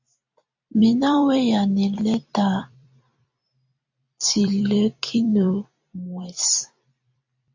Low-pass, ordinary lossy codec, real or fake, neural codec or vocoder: 7.2 kHz; AAC, 48 kbps; fake; vocoder, 44.1 kHz, 128 mel bands every 256 samples, BigVGAN v2